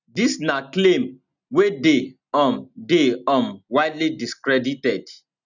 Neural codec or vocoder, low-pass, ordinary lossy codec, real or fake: none; 7.2 kHz; none; real